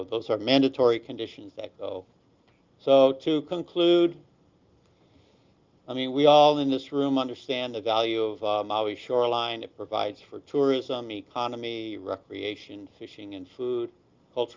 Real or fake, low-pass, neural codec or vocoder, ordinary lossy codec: real; 7.2 kHz; none; Opus, 24 kbps